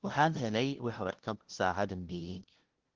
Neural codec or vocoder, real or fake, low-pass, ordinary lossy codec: codec, 16 kHz, 0.5 kbps, FunCodec, trained on LibriTTS, 25 frames a second; fake; 7.2 kHz; Opus, 16 kbps